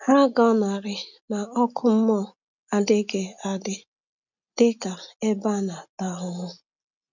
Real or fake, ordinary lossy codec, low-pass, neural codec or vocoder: real; none; 7.2 kHz; none